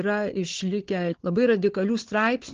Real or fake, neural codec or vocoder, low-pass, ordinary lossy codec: fake; codec, 16 kHz, 4 kbps, FunCodec, trained on Chinese and English, 50 frames a second; 7.2 kHz; Opus, 16 kbps